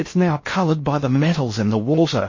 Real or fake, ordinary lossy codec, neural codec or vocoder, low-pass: fake; MP3, 32 kbps; codec, 16 kHz in and 24 kHz out, 0.6 kbps, FocalCodec, streaming, 4096 codes; 7.2 kHz